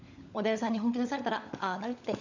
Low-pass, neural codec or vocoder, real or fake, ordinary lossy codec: 7.2 kHz; codec, 16 kHz, 16 kbps, FunCodec, trained on LibriTTS, 50 frames a second; fake; none